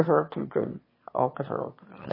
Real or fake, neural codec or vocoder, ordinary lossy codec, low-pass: fake; autoencoder, 22.05 kHz, a latent of 192 numbers a frame, VITS, trained on one speaker; MP3, 24 kbps; 5.4 kHz